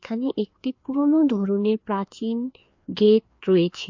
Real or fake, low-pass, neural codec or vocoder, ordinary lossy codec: fake; 7.2 kHz; codec, 16 kHz, 2 kbps, FreqCodec, larger model; MP3, 48 kbps